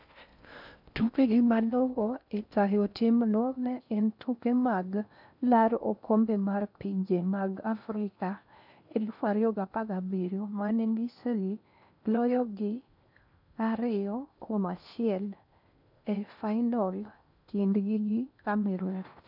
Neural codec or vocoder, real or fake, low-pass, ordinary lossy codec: codec, 16 kHz in and 24 kHz out, 0.6 kbps, FocalCodec, streaming, 4096 codes; fake; 5.4 kHz; none